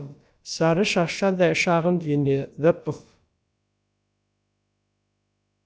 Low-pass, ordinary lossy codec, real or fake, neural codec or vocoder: none; none; fake; codec, 16 kHz, about 1 kbps, DyCAST, with the encoder's durations